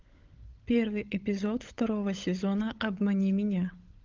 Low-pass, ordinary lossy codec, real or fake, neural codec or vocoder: 7.2 kHz; Opus, 24 kbps; fake; codec, 16 kHz, 16 kbps, FunCodec, trained on LibriTTS, 50 frames a second